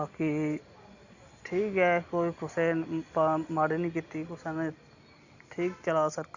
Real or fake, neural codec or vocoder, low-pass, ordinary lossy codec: real; none; 7.2 kHz; Opus, 64 kbps